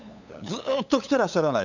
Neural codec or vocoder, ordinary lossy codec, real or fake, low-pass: codec, 16 kHz, 8 kbps, FunCodec, trained on LibriTTS, 25 frames a second; none; fake; 7.2 kHz